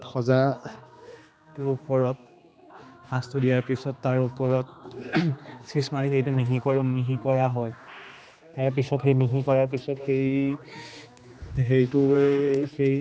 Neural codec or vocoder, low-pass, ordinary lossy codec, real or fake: codec, 16 kHz, 2 kbps, X-Codec, HuBERT features, trained on general audio; none; none; fake